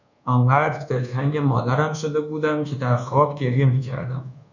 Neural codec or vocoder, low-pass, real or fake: codec, 24 kHz, 1.2 kbps, DualCodec; 7.2 kHz; fake